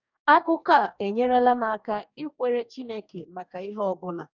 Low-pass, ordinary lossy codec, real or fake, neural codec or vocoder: 7.2 kHz; none; fake; codec, 44.1 kHz, 2.6 kbps, DAC